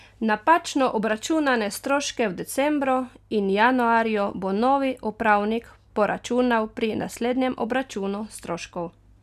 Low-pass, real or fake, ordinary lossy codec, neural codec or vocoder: 14.4 kHz; real; none; none